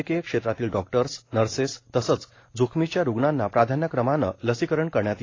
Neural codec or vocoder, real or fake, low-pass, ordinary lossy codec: none; real; 7.2 kHz; AAC, 32 kbps